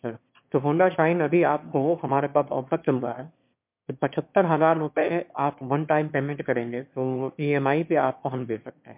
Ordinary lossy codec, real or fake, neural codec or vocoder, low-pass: MP3, 32 kbps; fake; autoencoder, 22.05 kHz, a latent of 192 numbers a frame, VITS, trained on one speaker; 3.6 kHz